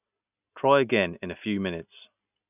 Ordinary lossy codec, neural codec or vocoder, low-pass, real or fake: none; none; 3.6 kHz; real